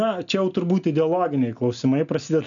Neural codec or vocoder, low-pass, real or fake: none; 7.2 kHz; real